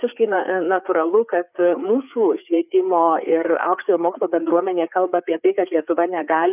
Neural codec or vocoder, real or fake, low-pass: codec, 16 kHz, 4 kbps, FreqCodec, larger model; fake; 3.6 kHz